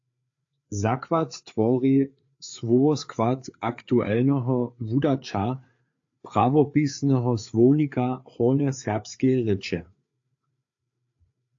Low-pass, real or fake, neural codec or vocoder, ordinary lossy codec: 7.2 kHz; fake; codec, 16 kHz, 4 kbps, FreqCodec, larger model; AAC, 48 kbps